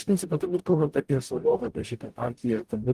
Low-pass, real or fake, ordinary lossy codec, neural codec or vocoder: 14.4 kHz; fake; Opus, 24 kbps; codec, 44.1 kHz, 0.9 kbps, DAC